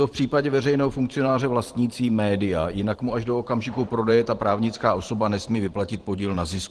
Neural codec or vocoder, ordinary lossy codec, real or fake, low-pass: vocoder, 44.1 kHz, 128 mel bands every 512 samples, BigVGAN v2; Opus, 16 kbps; fake; 10.8 kHz